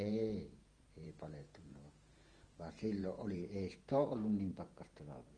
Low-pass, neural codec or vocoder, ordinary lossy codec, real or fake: 9.9 kHz; vocoder, 48 kHz, 128 mel bands, Vocos; Opus, 24 kbps; fake